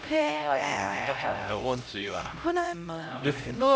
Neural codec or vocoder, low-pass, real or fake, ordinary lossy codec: codec, 16 kHz, 0.5 kbps, X-Codec, HuBERT features, trained on LibriSpeech; none; fake; none